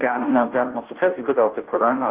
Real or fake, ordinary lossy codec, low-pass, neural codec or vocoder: fake; Opus, 16 kbps; 3.6 kHz; codec, 16 kHz, 0.5 kbps, FunCodec, trained on Chinese and English, 25 frames a second